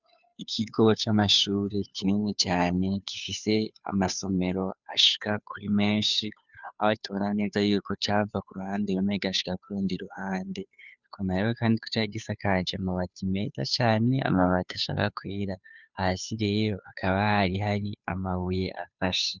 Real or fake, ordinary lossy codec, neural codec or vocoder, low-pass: fake; Opus, 64 kbps; codec, 16 kHz, 2 kbps, FunCodec, trained on Chinese and English, 25 frames a second; 7.2 kHz